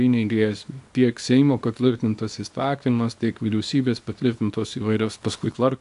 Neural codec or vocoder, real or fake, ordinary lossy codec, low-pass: codec, 24 kHz, 0.9 kbps, WavTokenizer, small release; fake; AAC, 64 kbps; 10.8 kHz